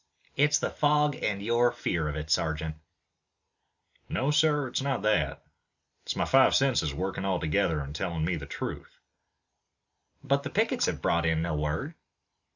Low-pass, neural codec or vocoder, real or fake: 7.2 kHz; none; real